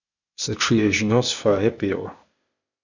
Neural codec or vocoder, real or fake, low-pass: codec, 16 kHz, 0.8 kbps, ZipCodec; fake; 7.2 kHz